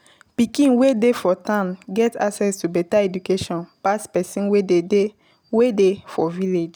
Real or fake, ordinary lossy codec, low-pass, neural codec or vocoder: real; none; none; none